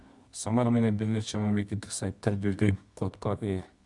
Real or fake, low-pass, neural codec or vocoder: fake; 10.8 kHz; codec, 24 kHz, 0.9 kbps, WavTokenizer, medium music audio release